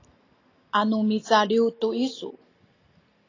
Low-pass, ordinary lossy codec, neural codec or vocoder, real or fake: 7.2 kHz; AAC, 32 kbps; none; real